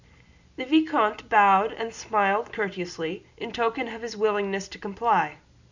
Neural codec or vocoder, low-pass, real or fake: none; 7.2 kHz; real